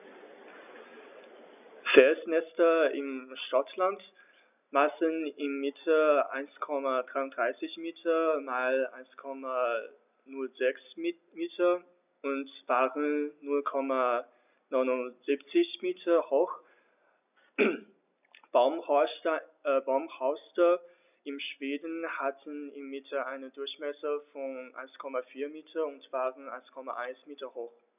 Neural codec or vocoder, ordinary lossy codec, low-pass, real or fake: none; none; 3.6 kHz; real